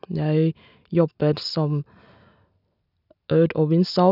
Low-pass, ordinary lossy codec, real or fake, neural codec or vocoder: 5.4 kHz; none; real; none